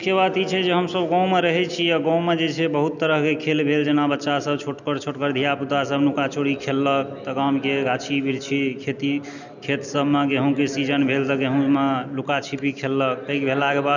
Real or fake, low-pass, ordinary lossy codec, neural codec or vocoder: real; 7.2 kHz; none; none